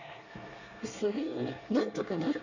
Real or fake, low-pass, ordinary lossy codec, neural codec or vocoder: fake; 7.2 kHz; Opus, 64 kbps; codec, 24 kHz, 1 kbps, SNAC